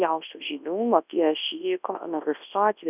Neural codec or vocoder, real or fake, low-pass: codec, 24 kHz, 0.9 kbps, WavTokenizer, large speech release; fake; 3.6 kHz